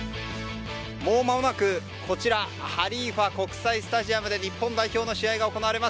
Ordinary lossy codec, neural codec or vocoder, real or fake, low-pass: none; none; real; none